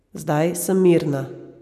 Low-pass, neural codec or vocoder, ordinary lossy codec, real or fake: 14.4 kHz; none; none; real